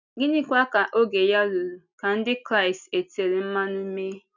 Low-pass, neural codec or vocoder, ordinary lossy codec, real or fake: 7.2 kHz; none; none; real